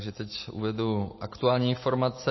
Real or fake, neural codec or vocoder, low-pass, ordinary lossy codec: real; none; 7.2 kHz; MP3, 24 kbps